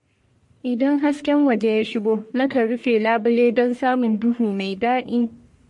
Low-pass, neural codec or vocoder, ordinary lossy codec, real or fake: 10.8 kHz; codec, 44.1 kHz, 1.7 kbps, Pupu-Codec; MP3, 48 kbps; fake